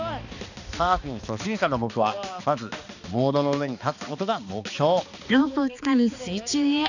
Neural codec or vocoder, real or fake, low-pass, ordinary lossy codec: codec, 16 kHz, 2 kbps, X-Codec, HuBERT features, trained on balanced general audio; fake; 7.2 kHz; none